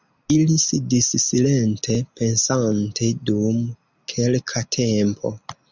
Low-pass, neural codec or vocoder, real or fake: 7.2 kHz; none; real